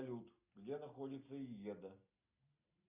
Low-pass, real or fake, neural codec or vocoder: 3.6 kHz; real; none